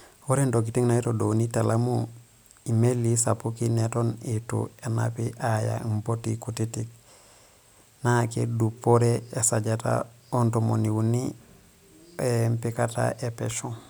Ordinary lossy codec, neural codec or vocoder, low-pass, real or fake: none; none; none; real